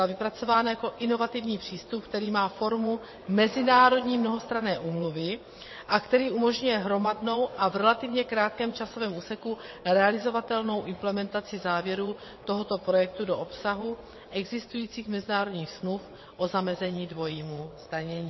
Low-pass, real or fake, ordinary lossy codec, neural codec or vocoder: 7.2 kHz; fake; MP3, 24 kbps; vocoder, 22.05 kHz, 80 mel bands, WaveNeXt